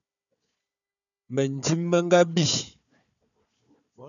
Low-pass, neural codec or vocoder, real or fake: 7.2 kHz; codec, 16 kHz, 4 kbps, FunCodec, trained on Chinese and English, 50 frames a second; fake